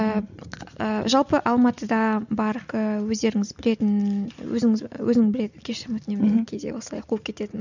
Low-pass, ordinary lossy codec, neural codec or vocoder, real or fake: 7.2 kHz; none; none; real